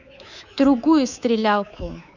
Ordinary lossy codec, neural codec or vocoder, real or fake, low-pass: none; codec, 24 kHz, 3.1 kbps, DualCodec; fake; 7.2 kHz